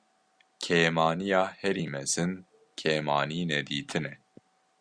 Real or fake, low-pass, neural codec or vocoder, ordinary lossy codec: real; 9.9 kHz; none; Opus, 64 kbps